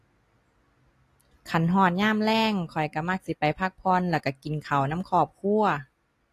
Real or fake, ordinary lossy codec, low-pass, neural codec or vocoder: real; AAC, 48 kbps; 14.4 kHz; none